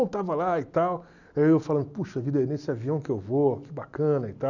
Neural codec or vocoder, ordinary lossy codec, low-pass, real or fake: none; none; 7.2 kHz; real